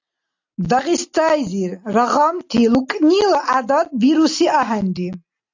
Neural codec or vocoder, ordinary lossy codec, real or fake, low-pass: none; AAC, 48 kbps; real; 7.2 kHz